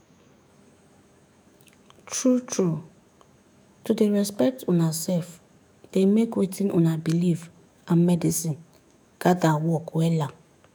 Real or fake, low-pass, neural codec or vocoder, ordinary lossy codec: fake; none; autoencoder, 48 kHz, 128 numbers a frame, DAC-VAE, trained on Japanese speech; none